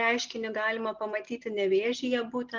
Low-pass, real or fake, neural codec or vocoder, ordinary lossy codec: 7.2 kHz; real; none; Opus, 32 kbps